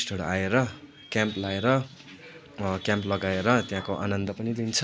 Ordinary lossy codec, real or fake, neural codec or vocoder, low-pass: none; real; none; none